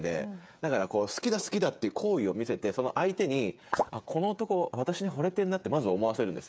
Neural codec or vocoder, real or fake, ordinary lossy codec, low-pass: codec, 16 kHz, 8 kbps, FreqCodec, smaller model; fake; none; none